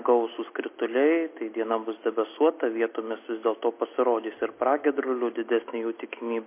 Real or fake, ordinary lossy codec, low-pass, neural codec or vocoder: real; MP3, 24 kbps; 3.6 kHz; none